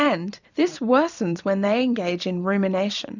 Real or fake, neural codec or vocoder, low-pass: fake; vocoder, 44.1 kHz, 128 mel bands every 256 samples, BigVGAN v2; 7.2 kHz